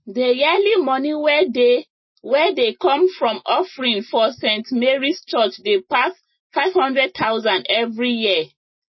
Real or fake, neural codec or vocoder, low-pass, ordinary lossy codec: real; none; 7.2 kHz; MP3, 24 kbps